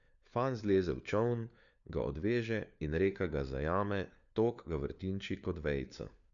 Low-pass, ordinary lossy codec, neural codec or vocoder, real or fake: 7.2 kHz; none; codec, 16 kHz, 4 kbps, FunCodec, trained on LibriTTS, 50 frames a second; fake